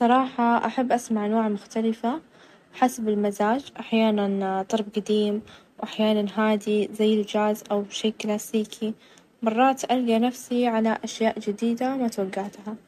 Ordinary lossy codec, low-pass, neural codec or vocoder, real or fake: MP3, 64 kbps; 14.4 kHz; none; real